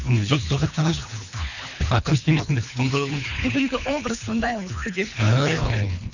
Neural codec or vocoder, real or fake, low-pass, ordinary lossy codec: codec, 24 kHz, 3 kbps, HILCodec; fake; 7.2 kHz; none